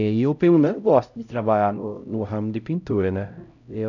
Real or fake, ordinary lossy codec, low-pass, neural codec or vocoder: fake; none; 7.2 kHz; codec, 16 kHz, 0.5 kbps, X-Codec, HuBERT features, trained on LibriSpeech